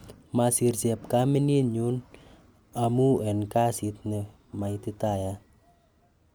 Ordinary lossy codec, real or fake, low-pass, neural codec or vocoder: none; real; none; none